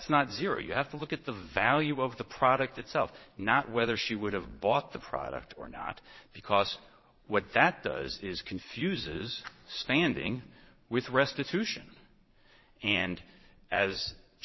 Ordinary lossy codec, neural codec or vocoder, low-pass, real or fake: MP3, 24 kbps; vocoder, 22.05 kHz, 80 mel bands, Vocos; 7.2 kHz; fake